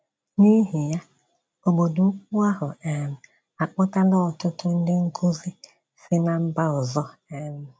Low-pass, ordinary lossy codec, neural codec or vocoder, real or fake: none; none; none; real